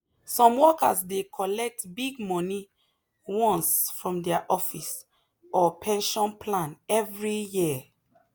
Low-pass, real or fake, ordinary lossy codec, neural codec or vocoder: none; real; none; none